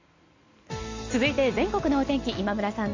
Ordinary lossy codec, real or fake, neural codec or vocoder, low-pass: AAC, 48 kbps; real; none; 7.2 kHz